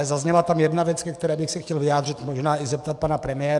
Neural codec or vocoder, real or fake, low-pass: codec, 44.1 kHz, 7.8 kbps, DAC; fake; 10.8 kHz